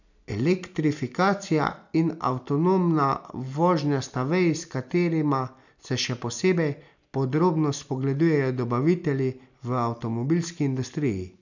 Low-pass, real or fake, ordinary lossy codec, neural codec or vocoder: 7.2 kHz; real; none; none